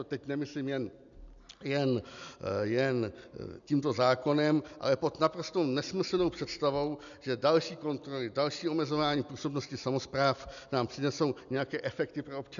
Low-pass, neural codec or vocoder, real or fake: 7.2 kHz; none; real